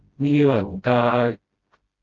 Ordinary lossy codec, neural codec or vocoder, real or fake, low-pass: Opus, 32 kbps; codec, 16 kHz, 0.5 kbps, FreqCodec, smaller model; fake; 7.2 kHz